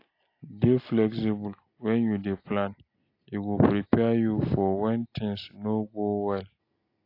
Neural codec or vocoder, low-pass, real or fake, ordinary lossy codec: none; 5.4 kHz; real; AAC, 32 kbps